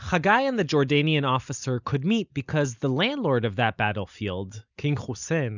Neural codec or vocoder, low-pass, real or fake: none; 7.2 kHz; real